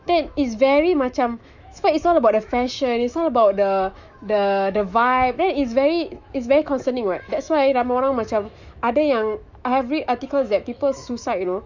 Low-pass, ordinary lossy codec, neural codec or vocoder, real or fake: 7.2 kHz; none; autoencoder, 48 kHz, 128 numbers a frame, DAC-VAE, trained on Japanese speech; fake